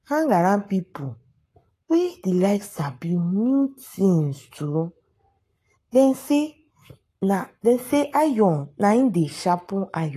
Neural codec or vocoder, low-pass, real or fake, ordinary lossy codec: codec, 44.1 kHz, 7.8 kbps, Pupu-Codec; 14.4 kHz; fake; AAC, 48 kbps